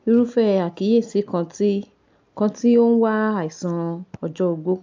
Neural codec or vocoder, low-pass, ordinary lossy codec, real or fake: none; 7.2 kHz; MP3, 64 kbps; real